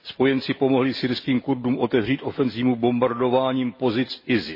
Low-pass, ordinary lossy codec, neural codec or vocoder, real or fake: 5.4 kHz; MP3, 24 kbps; none; real